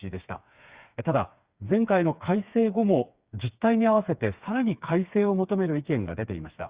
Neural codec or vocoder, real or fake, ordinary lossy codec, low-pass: codec, 16 kHz, 4 kbps, FreqCodec, smaller model; fake; Opus, 64 kbps; 3.6 kHz